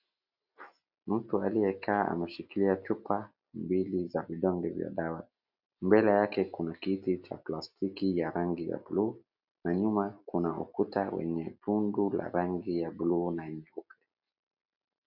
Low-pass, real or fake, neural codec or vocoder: 5.4 kHz; real; none